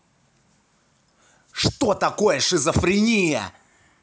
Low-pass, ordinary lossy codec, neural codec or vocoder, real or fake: none; none; none; real